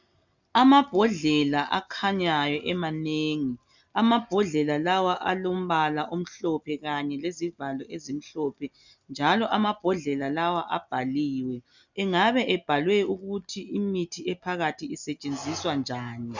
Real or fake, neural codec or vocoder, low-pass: real; none; 7.2 kHz